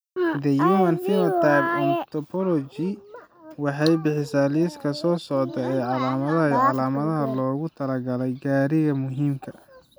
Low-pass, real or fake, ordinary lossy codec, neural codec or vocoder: none; real; none; none